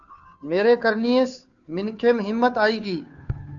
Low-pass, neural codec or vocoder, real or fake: 7.2 kHz; codec, 16 kHz, 2 kbps, FunCodec, trained on Chinese and English, 25 frames a second; fake